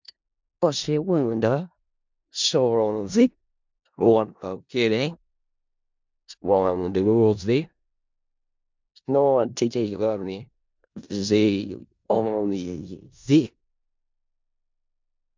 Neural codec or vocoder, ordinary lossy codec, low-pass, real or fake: codec, 16 kHz in and 24 kHz out, 0.4 kbps, LongCat-Audio-Codec, four codebook decoder; MP3, 64 kbps; 7.2 kHz; fake